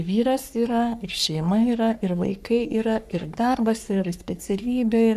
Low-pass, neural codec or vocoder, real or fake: 14.4 kHz; codec, 44.1 kHz, 3.4 kbps, Pupu-Codec; fake